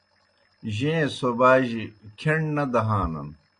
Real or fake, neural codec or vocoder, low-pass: real; none; 9.9 kHz